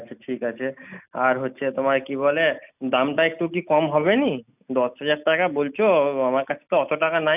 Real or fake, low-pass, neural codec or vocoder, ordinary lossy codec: real; 3.6 kHz; none; none